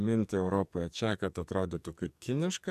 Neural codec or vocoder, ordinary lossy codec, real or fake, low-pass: codec, 44.1 kHz, 2.6 kbps, SNAC; AAC, 96 kbps; fake; 14.4 kHz